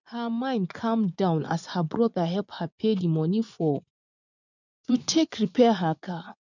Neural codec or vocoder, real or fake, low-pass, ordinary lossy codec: vocoder, 24 kHz, 100 mel bands, Vocos; fake; 7.2 kHz; none